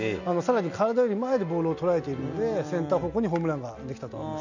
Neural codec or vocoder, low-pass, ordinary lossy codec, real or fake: none; 7.2 kHz; none; real